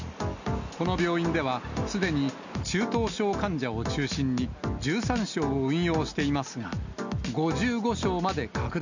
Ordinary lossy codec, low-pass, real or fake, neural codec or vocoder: none; 7.2 kHz; real; none